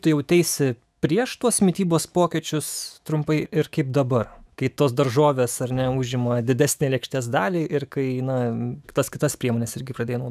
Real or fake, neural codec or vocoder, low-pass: fake; autoencoder, 48 kHz, 128 numbers a frame, DAC-VAE, trained on Japanese speech; 14.4 kHz